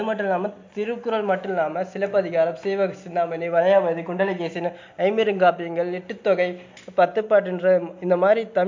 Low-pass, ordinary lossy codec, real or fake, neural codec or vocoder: 7.2 kHz; MP3, 48 kbps; real; none